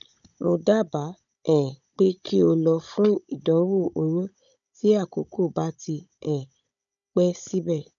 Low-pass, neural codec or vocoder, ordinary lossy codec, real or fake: 7.2 kHz; codec, 16 kHz, 16 kbps, FunCodec, trained on Chinese and English, 50 frames a second; none; fake